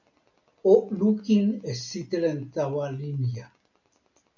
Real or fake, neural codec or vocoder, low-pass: real; none; 7.2 kHz